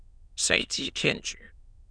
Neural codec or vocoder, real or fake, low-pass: autoencoder, 22.05 kHz, a latent of 192 numbers a frame, VITS, trained on many speakers; fake; 9.9 kHz